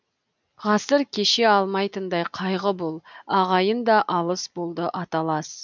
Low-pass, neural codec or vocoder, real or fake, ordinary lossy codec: 7.2 kHz; none; real; none